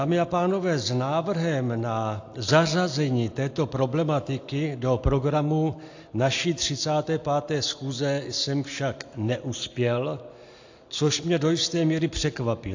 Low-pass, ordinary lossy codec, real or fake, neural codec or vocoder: 7.2 kHz; AAC, 48 kbps; real; none